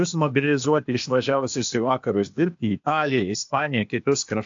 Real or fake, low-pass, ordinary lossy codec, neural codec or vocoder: fake; 7.2 kHz; AAC, 64 kbps; codec, 16 kHz, 0.8 kbps, ZipCodec